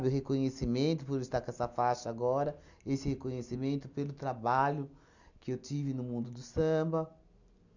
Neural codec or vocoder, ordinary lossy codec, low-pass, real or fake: none; none; 7.2 kHz; real